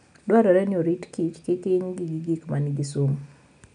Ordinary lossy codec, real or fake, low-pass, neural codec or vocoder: none; real; 9.9 kHz; none